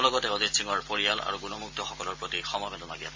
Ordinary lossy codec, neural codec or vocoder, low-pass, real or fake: MP3, 48 kbps; none; 7.2 kHz; real